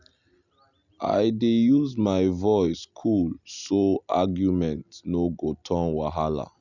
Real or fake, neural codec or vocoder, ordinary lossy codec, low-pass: real; none; none; 7.2 kHz